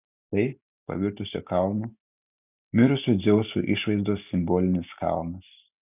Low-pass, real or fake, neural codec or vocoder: 3.6 kHz; real; none